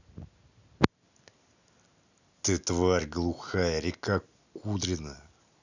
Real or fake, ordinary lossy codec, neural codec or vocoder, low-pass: real; none; none; 7.2 kHz